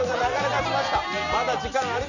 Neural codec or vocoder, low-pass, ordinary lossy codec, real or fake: none; 7.2 kHz; none; real